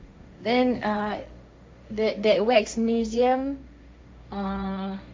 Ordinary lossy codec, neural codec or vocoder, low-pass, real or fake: none; codec, 16 kHz, 1.1 kbps, Voila-Tokenizer; none; fake